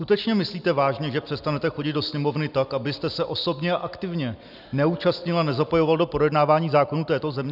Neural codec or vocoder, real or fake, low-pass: none; real; 5.4 kHz